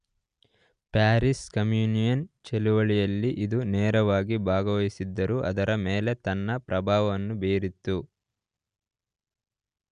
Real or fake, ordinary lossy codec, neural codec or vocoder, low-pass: real; Opus, 64 kbps; none; 9.9 kHz